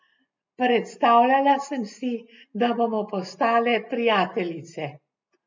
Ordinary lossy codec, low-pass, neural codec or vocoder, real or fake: MP3, 64 kbps; 7.2 kHz; none; real